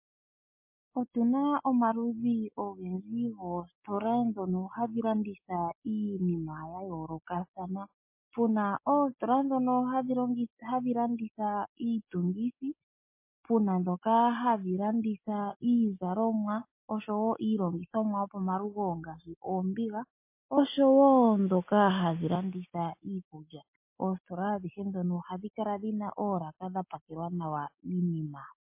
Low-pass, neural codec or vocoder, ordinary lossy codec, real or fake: 3.6 kHz; none; MP3, 24 kbps; real